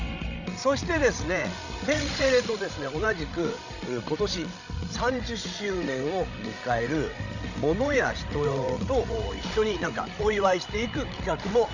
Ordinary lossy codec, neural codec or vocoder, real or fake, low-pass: none; codec, 16 kHz, 16 kbps, FreqCodec, larger model; fake; 7.2 kHz